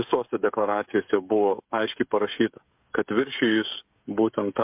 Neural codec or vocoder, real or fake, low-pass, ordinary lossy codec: codec, 16 kHz, 16 kbps, FreqCodec, smaller model; fake; 3.6 kHz; MP3, 32 kbps